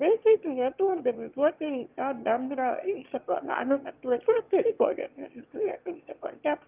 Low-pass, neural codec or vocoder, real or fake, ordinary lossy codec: 3.6 kHz; autoencoder, 22.05 kHz, a latent of 192 numbers a frame, VITS, trained on one speaker; fake; Opus, 24 kbps